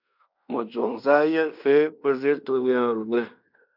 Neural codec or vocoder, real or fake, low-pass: codec, 16 kHz in and 24 kHz out, 0.9 kbps, LongCat-Audio-Codec, fine tuned four codebook decoder; fake; 5.4 kHz